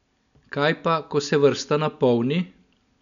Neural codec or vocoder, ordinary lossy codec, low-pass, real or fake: none; none; 7.2 kHz; real